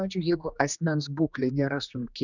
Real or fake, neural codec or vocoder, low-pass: fake; codec, 16 kHz, 2 kbps, X-Codec, HuBERT features, trained on general audio; 7.2 kHz